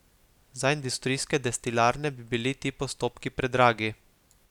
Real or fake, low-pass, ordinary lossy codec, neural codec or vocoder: real; 19.8 kHz; none; none